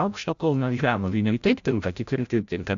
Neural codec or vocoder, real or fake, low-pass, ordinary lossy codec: codec, 16 kHz, 0.5 kbps, FreqCodec, larger model; fake; 7.2 kHz; AAC, 64 kbps